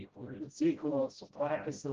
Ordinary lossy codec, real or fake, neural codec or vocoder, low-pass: Opus, 16 kbps; fake; codec, 16 kHz, 0.5 kbps, FreqCodec, smaller model; 7.2 kHz